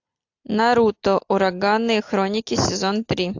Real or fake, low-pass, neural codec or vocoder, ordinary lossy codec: real; 7.2 kHz; none; AAC, 48 kbps